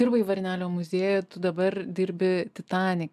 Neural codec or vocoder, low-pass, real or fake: none; 14.4 kHz; real